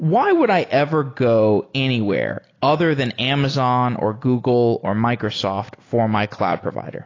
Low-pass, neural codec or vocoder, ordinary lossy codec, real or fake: 7.2 kHz; none; AAC, 32 kbps; real